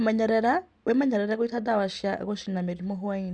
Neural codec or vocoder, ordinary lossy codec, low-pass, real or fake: none; Opus, 64 kbps; 9.9 kHz; real